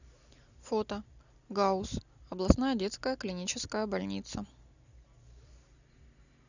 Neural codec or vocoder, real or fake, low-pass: none; real; 7.2 kHz